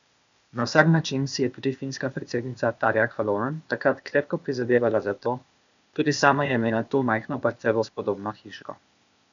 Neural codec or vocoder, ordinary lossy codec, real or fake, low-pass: codec, 16 kHz, 0.8 kbps, ZipCodec; MP3, 96 kbps; fake; 7.2 kHz